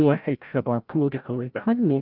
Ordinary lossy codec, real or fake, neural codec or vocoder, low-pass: Opus, 24 kbps; fake; codec, 16 kHz, 0.5 kbps, FreqCodec, larger model; 5.4 kHz